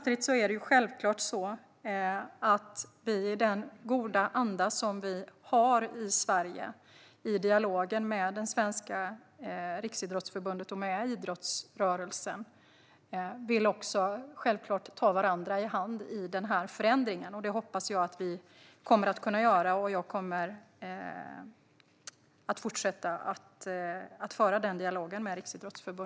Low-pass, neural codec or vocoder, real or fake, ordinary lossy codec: none; none; real; none